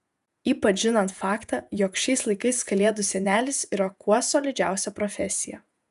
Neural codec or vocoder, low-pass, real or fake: vocoder, 48 kHz, 128 mel bands, Vocos; 14.4 kHz; fake